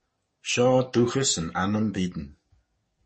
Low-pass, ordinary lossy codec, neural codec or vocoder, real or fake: 10.8 kHz; MP3, 32 kbps; codec, 44.1 kHz, 7.8 kbps, DAC; fake